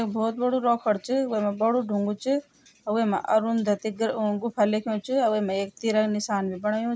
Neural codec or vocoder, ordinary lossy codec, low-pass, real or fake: none; none; none; real